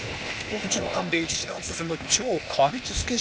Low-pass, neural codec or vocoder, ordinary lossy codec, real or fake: none; codec, 16 kHz, 0.8 kbps, ZipCodec; none; fake